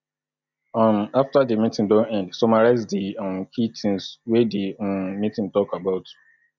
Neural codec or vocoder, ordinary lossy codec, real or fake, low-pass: none; none; real; 7.2 kHz